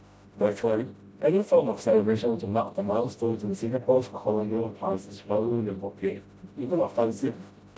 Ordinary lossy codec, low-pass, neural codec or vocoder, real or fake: none; none; codec, 16 kHz, 0.5 kbps, FreqCodec, smaller model; fake